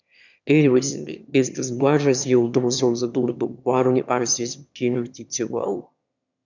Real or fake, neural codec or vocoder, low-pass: fake; autoencoder, 22.05 kHz, a latent of 192 numbers a frame, VITS, trained on one speaker; 7.2 kHz